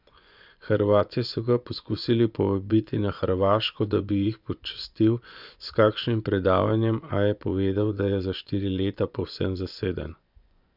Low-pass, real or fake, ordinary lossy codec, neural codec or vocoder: 5.4 kHz; fake; none; vocoder, 24 kHz, 100 mel bands, Vocos